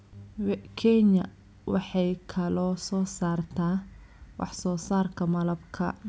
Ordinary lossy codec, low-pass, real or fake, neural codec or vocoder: none; none; real; none